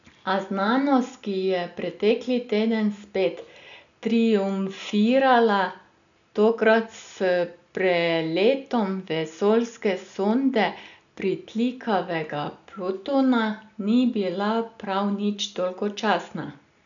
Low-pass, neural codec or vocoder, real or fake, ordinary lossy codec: 7.2 kHz; none; real; none